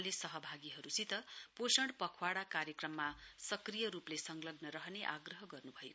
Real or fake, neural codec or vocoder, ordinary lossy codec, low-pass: real; none; none; none